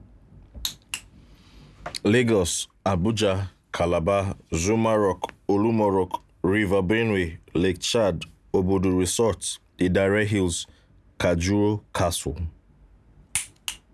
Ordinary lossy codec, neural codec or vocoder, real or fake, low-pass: none; none; real; none